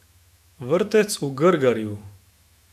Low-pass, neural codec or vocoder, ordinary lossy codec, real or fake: 14.4 kHz; autoencoder, 48 kHz, 128 numbers a frame, DAC-VAE, trained on Japanese speech; AAC, 96 kbps; fake